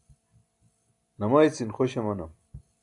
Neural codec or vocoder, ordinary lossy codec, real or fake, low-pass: none; AAC, 64 kbps; real; 10.8 kHz